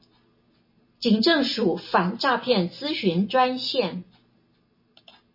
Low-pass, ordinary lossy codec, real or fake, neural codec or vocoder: 5.4 kHz; MP3, 24 kbps; real; none